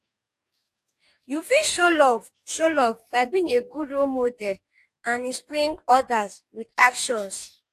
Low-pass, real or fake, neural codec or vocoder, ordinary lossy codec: 14.4 kHz; fake; codec, 44.1 kHz, 2.6 kbps, DAC; AAC, 64 kbps